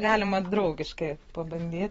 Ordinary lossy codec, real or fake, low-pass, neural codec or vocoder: AAC, 24 kbps; fake; 19.8 kHz; vocoder, 44.1 kHz, 128 mel bands every 512 samples, BigVGAN v2